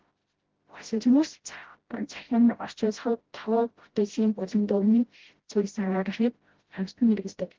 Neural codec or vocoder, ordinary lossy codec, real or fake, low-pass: codec, 16 kHz, 0.5 kbps, FreqCodec, smaller model; Opus, 16 kbps; fake; 7.2 kHz